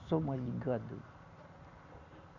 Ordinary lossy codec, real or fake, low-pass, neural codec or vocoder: none; real; 7.2 kHz; none